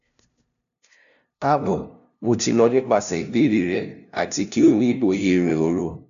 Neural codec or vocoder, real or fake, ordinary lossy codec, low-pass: codec, 16 kHz, 0.5 kbps, FunCodec, trained on LibriTTS, 25 frames a second; fake; none; 7.2 kHz